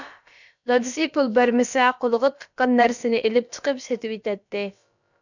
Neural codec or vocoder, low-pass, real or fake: codec, 16 kHz, about 1 kbps, DyCAST, with the encoder's durations; 7.2 kHz; fake